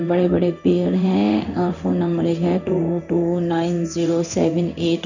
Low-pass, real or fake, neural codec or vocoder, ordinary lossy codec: 7.2 kHz; fake; codec, 16 kHz in and 24 kHz out, 1 kbps, XY-Tokenizer; none